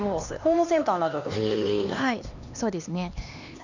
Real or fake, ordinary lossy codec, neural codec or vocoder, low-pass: fake; none; codec, 16 kHz, 2 kbps, X-Codec, HuBERT features, trained on LibriSpeech; 7.2 kHz